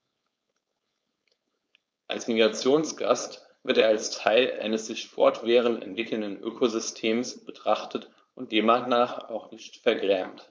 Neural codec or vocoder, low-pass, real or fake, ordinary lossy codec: codec, 16 kHz, 4.8 kbps, FACodec; none; fake; none